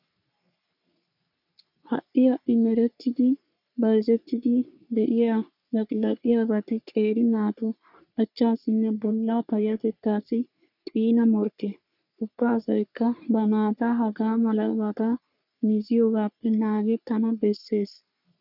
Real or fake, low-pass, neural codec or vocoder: fake; 5.4 kHz; codec, 44.1 kHz, 3.4 kbps, Pupu-Codec